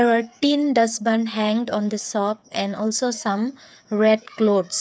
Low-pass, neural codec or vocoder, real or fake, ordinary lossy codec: none; codec, 16 kHz, 8 kbps, FreqCodec, smaller model; fake; none